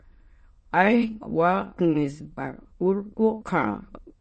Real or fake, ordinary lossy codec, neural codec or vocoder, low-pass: fake; MP3, 32 kbps; autoencoder, 22.05 kHz, a latent of 192 numbers a frame, VITS, trained on many speakers; 9.9 kHz